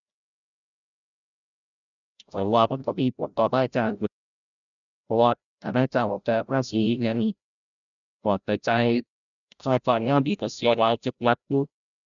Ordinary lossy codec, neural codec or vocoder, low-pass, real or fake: none; codec, 16 kHz, 0.5 kbps, FreqCodec, larger model; 7.2 kHz; fake